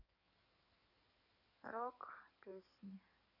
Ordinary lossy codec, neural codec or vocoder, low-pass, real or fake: none; codec, 16 kHz in and 24 kHz out, 2.2 kbps, FireRedTTS-2 codec; 5.4 kHz; fake